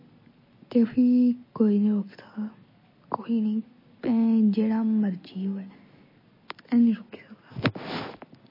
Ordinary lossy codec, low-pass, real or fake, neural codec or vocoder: MP3, 24 kbps; 5.4 kHz; real; none